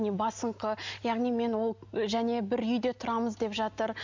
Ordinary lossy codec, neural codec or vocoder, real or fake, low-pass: MP3, 64 kbps; none; real; 7.2 kHz